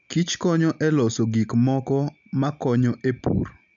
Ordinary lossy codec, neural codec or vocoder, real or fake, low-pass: none; none; real; 7.2 kHz